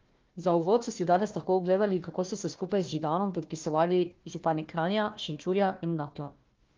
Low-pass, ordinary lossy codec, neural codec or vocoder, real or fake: 7.2 kHz; Opus, 32 kbps; codec, 16 kHz, 1 kbps, FunCodec, trained on Chinese and English, 50 frames a second; fake